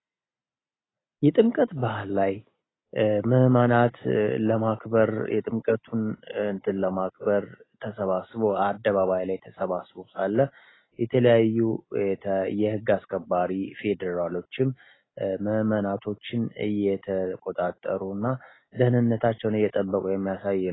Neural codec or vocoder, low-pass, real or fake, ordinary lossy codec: none; 7.2 kHz; real; AAC, 16 kbps